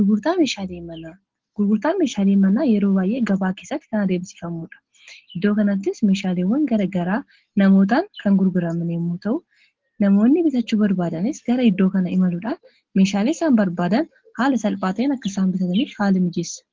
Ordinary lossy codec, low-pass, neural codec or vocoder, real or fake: Opus, 16 kbps; 7.2 kHz; none; real